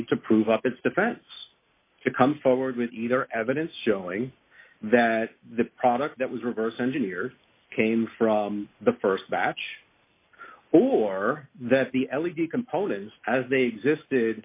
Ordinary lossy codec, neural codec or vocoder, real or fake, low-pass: AAC, 32 kbps; none; real; 3.6 kHz